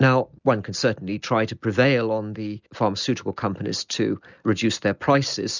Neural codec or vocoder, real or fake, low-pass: none; real; 7.2 kHz